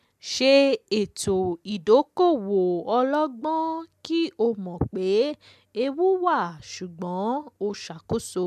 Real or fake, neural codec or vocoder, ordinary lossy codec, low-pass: real; none; MP3, 96 kbps; 14.4 kHz